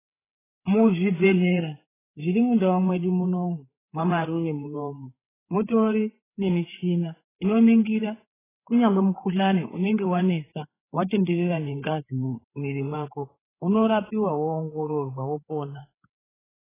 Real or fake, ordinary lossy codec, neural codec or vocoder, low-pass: fake; AAC, 16 kbps; codec, 16 kHz, 8 kbps, FreqCodec, larger model; 3.6 kHz